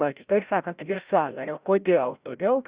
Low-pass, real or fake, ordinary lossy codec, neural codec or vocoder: 3.6 kHz; fake; Opus, 64 kbps; codec, 16 kHz, 0.5 kbps, FreqCodec, larger model